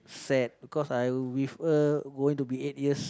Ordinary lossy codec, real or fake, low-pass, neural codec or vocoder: none; real; none; none